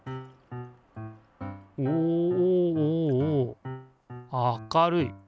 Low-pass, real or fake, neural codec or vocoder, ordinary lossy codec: none; real; none; none